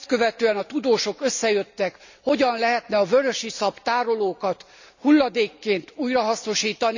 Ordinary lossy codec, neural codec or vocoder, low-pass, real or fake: none; none; 7.2 kHz; real